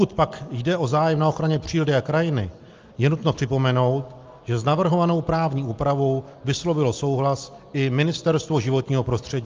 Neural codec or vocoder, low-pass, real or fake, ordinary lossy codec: none; 7.2 kHz; real; Opus, 32 kbps